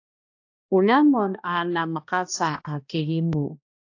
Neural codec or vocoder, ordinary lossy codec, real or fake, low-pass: codec, 16 kHz, 1 kbps, X-Codec, HuBERT features, trained on balanced general audio; AAC, 48 kbps; fake; 7.2 kHz